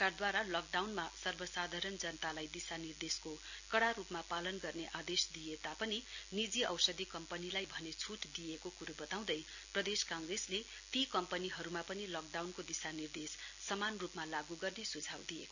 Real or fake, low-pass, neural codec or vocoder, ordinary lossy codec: real; 7.2 kHz; none; none